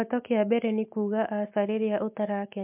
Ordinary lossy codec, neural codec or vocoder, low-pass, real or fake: MP3, 32 kbps; none; 3.6 kHz; real